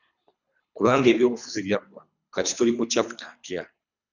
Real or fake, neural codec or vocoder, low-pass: fake; codec, 24 kHz, 3 kbps, HILCodec; 7.2 kHz